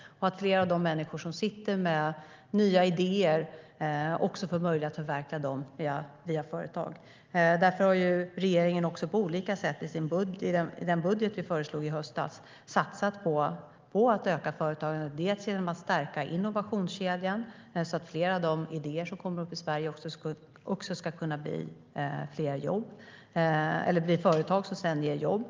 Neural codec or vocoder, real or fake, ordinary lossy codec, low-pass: none; real; Opus, 32 kbps; 7.2 kHz